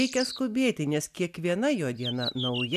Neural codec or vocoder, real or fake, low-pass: none; real; 14.4 kHz